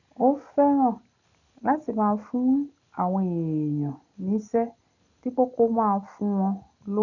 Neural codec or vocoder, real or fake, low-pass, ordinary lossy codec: none; real; 7.2 kHz; none